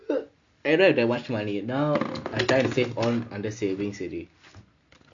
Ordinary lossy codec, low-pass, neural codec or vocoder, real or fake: MP3, 64 kbps; 7.2 kHz; none; real